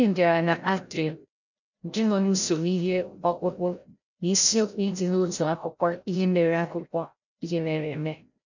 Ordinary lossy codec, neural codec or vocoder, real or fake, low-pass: none; codec, 16 kHz, 0.5 kbps, FreqCodec, larger model; fake; 7.2 kHz